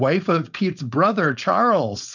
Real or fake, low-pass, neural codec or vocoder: fake; 7.2 kHz; codec, 16 kHz, 4.8 kbps, FACodec